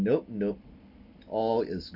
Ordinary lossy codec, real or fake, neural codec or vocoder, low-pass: none; real; none; 5.4 kHz